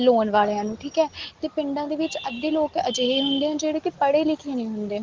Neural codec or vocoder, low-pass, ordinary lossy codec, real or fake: none; 7.2 kHz; Opus, 16 kbps; real